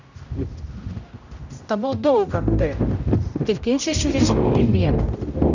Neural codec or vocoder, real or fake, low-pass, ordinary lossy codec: codec, 16 kHz, 0.5 kbps, X-Codec, HuBERT features, trained on general audio; fake; 7.2 kHz; none